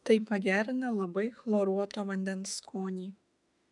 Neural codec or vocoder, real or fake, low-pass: autoencoder, 48 kHz, 32 numbers a frame, DAC-VAE, trained on Japanese speech; fake; 10.8 kHz